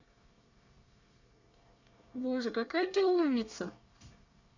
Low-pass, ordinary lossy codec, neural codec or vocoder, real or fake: 7.2 kHz; none; codec, 24 kHz, 1 kbps, SNAC; fake